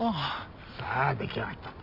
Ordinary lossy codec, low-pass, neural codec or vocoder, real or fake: none; 5.4 kHz; none; real